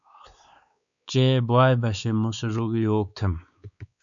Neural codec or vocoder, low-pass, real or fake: codec, 16 kHz, 4 kbps, X-Codec, WavLM features, trained on Multilingual LibriSpeech; 7.2 kHz; fake